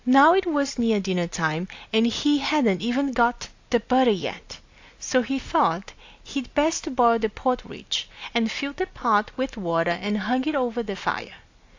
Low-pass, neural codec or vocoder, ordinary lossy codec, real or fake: 7.2 kHz; none; AAC, 48 kbps; real